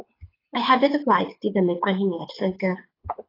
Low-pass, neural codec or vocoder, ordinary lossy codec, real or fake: 5.4 kHz; codec, 24 kHz, 6 kbps, HILCodec; AAC, 24 kbps; fake